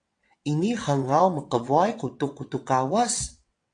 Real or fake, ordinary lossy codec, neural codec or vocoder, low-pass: fake; AAC, 48 kbps; vocoder, 22.05 kHz, 80 mel bands, WaveNeXt; 9.9 kHz